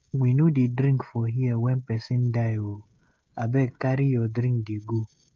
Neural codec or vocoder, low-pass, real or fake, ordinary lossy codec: codec, 16 kHz, 16 kbps, FreqCodec, smaller model; 7.2 kHz; fake; Opus, 24 kbps